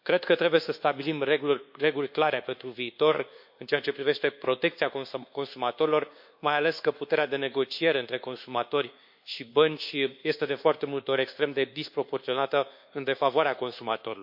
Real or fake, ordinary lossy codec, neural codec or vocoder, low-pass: fake; MP3, 48 kbps; codec, 24 kHz, 1.2 kbps, DualCodec; 5.4 kHz